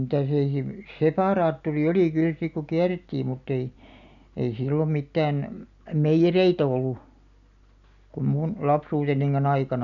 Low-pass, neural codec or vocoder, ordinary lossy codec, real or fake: 7.2 kHz; none; none; real